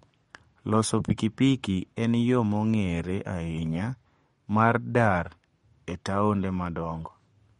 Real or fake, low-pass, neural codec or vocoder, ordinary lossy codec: fake; 19.8 kHz; codec, 44.1 kHz, 7.8 kbps, Pupu-Codec; MP3, 48 kbps